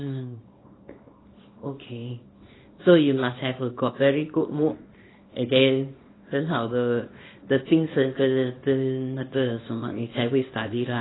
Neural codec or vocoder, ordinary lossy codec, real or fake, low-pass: codec, 24 kHz, 0.9 kbps, WavTokenizer, small release; AAC, 16 kbps; fake; 7.2 kHz